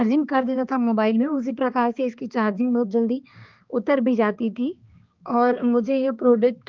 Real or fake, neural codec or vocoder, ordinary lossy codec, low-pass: fake; codec, 16 kHz, 2 kbps, X-Codec, HuBERT features, trained on balanced general audio; Opus, 32 kbps; 7.2 kHz